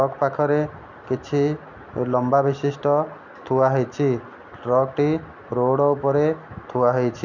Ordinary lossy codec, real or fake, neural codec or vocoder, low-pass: none; real; none; 7.2 kHz